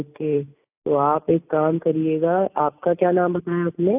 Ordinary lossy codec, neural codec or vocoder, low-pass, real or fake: AAC, 32 kbps; none; 3.6 kHz; real